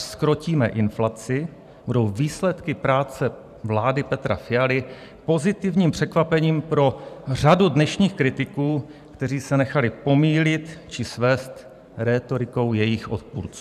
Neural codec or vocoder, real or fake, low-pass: vocoder, 44.1 kHz, 128 mel bands every 512 samples, BigVGAN v2; fake; 14.4 kHz